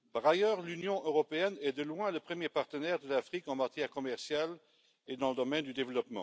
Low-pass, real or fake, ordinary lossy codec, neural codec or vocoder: none; real; none; none